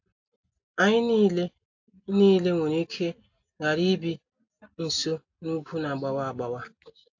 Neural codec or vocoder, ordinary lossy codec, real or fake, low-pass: none; AAC, 48 kbps; real; 7.2 kHz